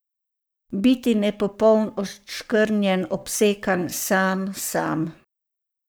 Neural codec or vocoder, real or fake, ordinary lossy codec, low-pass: codec, 44.1 kHz, 7.8 kbps, Pupu-Codec; fake; none; none